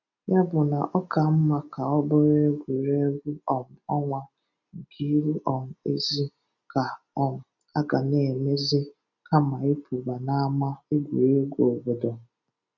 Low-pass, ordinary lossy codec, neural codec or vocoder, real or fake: 7.2 kHz; none; none; real